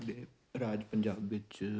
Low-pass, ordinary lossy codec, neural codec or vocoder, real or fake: none; none; none; real